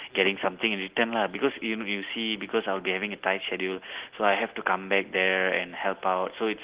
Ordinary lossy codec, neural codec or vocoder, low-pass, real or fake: Opus, 16 kbps; none; 3.6 kHz; real